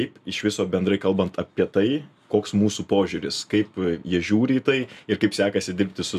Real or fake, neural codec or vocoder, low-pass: real; none; 14.4 kHz